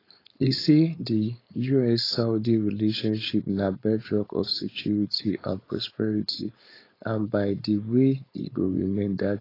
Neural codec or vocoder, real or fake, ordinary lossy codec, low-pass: codec, 16 kHz, 4.8 kbps, FACodec; fake; AAC, 24 kbps; 5.4 kHz